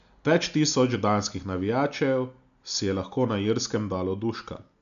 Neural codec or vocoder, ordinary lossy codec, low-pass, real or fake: none; none; 7.2 kHz; real